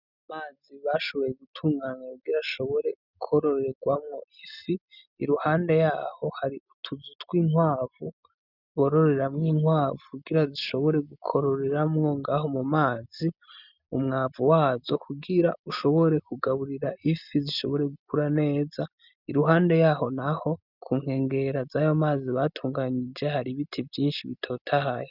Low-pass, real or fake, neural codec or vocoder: 5.4 kHz; real; none